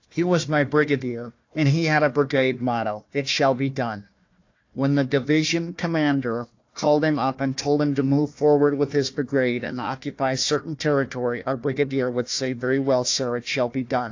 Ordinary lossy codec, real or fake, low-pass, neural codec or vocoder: AAC, 48 kbps; fake; 7.2 kHz; codec, 16 kHz, 1 kbps, FunCodec, trained on Chinese and English, 50 frames a second